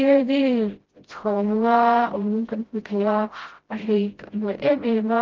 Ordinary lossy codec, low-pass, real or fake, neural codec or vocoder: Opus, 16 kbps; 7.2 kHz; fake; codec, 16 kHz, 0.5 kbps, FreqCodec, smaller model